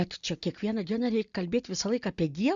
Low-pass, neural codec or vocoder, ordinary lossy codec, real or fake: 7.2 kHz; none; Opus, 64 kbps; real